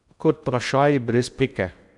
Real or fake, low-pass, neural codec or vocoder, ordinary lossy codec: fake; 10.8 kHz; codec, 16 kHz in and 24 kHz out, 0.8 kbps, FocalCodec, streaming, 65536 codes; none